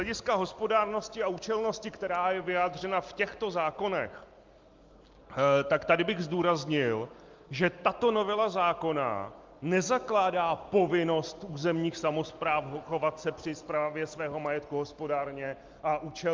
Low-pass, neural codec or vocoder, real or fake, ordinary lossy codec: 7.2 kHz; none; real; Opus, 32 kbps